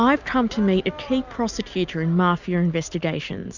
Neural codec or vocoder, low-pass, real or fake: none; 7.2 kHz; real